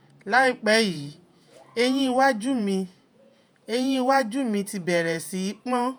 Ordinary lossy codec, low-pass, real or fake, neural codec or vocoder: none; none; fake; vocoder, 48 kHz, 128 mel bands, Vocos